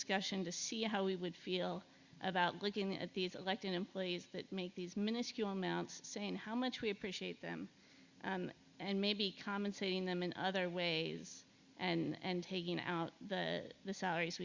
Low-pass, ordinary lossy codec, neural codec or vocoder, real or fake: 7.2 kHz; Opus, 64 kbps; none; real